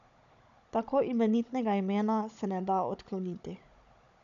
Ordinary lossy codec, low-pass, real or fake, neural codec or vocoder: MP3, 96 kbps; 7.2 kHz; fake; codec, 16 kHz, 16 kbps, FunCodec, trained on Chinese and English, 50 frames a second